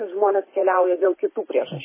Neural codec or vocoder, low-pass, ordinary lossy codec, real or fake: vocoder, 44.1 kHz, 128 mel bands every 512 samples, BigVGAN v2; 3.6 kHz; MP3, 16 kbps; fake